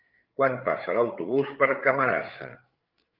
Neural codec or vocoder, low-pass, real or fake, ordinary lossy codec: codec, 16 kHz, 8 kbps, FreqCodec, larger model; 5.4 kHz; fake; Opus, 32 kbps